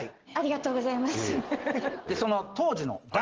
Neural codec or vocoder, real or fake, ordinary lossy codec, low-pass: none; real; Opus, 16 kbps; 7.2 kHz